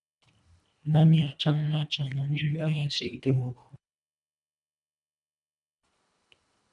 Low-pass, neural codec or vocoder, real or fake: 10.8 kHz; codec, 24 kHz, 1.5 kbps, HILCodec; fake